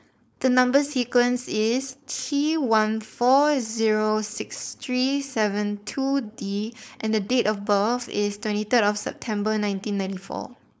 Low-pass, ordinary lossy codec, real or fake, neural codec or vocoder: none; none; fake; codec, 16 kHz, 4.8 kbps, FACodec